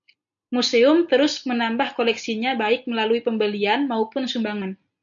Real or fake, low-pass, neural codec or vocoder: real; 7.2 kHz; none